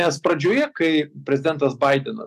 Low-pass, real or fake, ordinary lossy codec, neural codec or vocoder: 14.4 kHz; real; MP3, 96 kbps; none